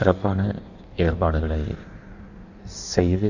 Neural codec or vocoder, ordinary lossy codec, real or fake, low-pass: codec, 16 kHz in and 24 kHz out, 1.1 kbps, FireRedTTS-2 codec; none; fake; 7.2 kHz